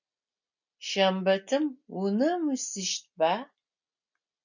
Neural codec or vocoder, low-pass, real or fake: none; 7.2 kHz; real